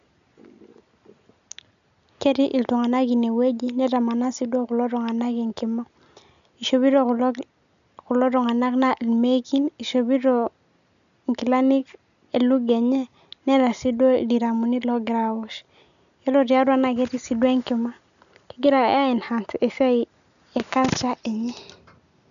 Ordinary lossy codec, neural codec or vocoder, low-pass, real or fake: none; none; 7.2 kHz; real